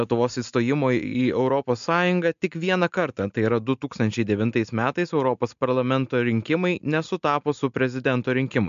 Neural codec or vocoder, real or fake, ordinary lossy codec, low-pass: none; real; MP3, 64 kbps; 7.2 kHz